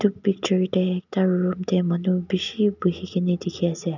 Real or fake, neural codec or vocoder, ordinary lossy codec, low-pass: real; none; none; 7.2 kHz